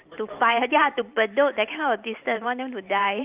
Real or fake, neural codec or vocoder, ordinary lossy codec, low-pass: fake; vocoder, 44.1 kHz, 128 mel bands every 512 samples, BigVGAN v2; Opus, 24 kbps; 3.6 kHz